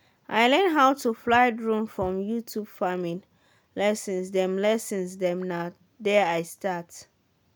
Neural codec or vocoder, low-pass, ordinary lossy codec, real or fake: none; none; none; real